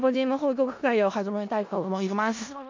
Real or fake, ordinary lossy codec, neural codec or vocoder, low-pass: fake; MP3, 48 kbps; codec, 16 kHz in and 24 kHz out, 0.4 kbps, LongCat-Audio-Codec, four codebook decoder; 7.2 kHz